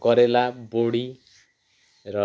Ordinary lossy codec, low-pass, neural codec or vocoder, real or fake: none; none; none; real